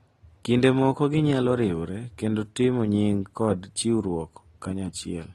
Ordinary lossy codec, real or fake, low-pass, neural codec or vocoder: AAC, 32 kbps; real; 19.8 kHz; none